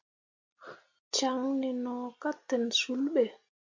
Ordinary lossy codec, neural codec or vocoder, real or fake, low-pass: MP3, 48 kbps; none; real; 7.2 kHz